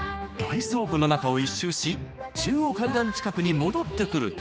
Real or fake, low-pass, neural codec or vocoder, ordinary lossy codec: fake; none; codec, 16 kHz, 2 kbps, X-Codec, HuBERT features, trained on general audio; none